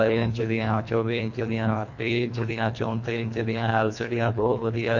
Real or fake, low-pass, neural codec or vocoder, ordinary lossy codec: fake; 7.2 kHz; codec, 24 kHz, 1.5 kbps, HILCodec; MP3, 48 kbps